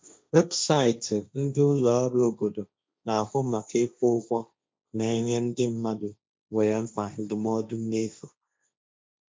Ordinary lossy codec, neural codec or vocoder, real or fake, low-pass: none; codec, 16 kHz, 1.1 kbps, Voila-Tokenizer; fake; none